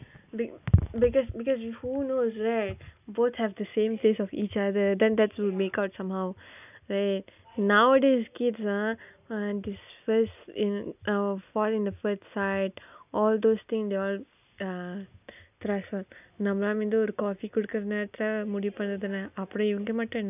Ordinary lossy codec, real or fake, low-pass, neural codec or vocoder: none; real; 3.6 kHz; none